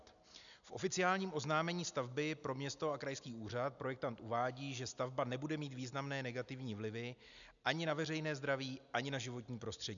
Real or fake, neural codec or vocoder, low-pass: real; none; 7.2 kHz